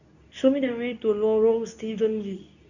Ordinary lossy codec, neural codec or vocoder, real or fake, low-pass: none; codec, 24 kHz, 0.9 kbps, WavTokenizer, medium speech release version 2; fake; 7.2 kHz